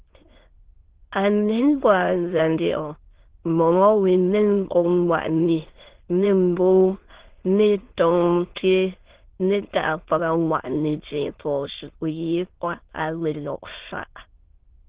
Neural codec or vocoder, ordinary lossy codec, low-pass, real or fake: autoencoder, 22.05 kHz, a latent of 192 numbers a frame, VITS, trained on many speakers; Opus, 16 kbps; 3.6 kHz; fake